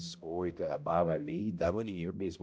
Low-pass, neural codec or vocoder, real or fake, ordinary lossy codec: none; codec, 16 kHz, 0.5 kbps, X-Codec, HuBERT features, trained on balanced general audio; fake; none